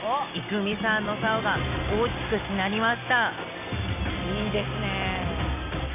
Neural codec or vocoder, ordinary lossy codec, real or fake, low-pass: none; none; real; 3.6 kHz